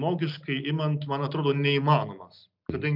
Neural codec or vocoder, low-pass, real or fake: none; 5.4 kHz; real